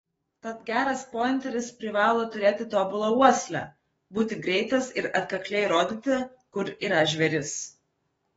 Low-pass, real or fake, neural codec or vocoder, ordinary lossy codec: 19.8 kHz; fake; codec, 44.1 kHz, 7.8 kbps, DAC; AAC, 24 kbps